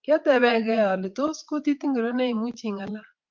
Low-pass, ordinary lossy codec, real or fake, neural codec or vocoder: 7.2 kHz; Opus, 24 kbps; fake; vocoder, 22.05 kHz, 80 mel bands, Vocos